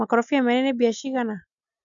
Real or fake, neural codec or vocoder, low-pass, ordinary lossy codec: real; none; 7.2 kHz; none